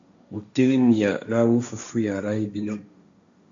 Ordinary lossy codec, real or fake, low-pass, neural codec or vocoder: AAC, 48 kbps; fake; 7.2 kHz; codec, 16 kHz, 1.1 kbps, Voila-Tokenizer